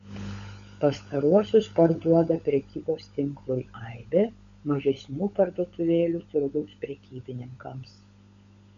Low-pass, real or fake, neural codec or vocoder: 7.2 kHz; fake; codec, 16 kHz, 16 kbps, FunCodec, trained on LibriTTS, 50 frames a second